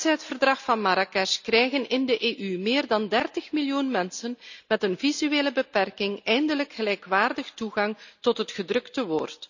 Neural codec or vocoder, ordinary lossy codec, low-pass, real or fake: none; none; 7.2 kHz; real